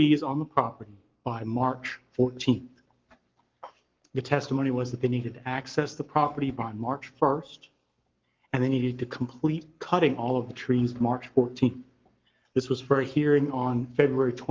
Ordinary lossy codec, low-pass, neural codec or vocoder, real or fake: Opus, 32 kbps; 7.2 kHz; codec, 44.1 kHz, 7.8 kbps, Pupu-Codec; fake